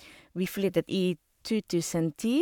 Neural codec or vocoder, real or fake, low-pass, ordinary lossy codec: codec, 44.1 kHz, 7.8 kbps, Pupu-Codec; fake; 19.8 kHz; none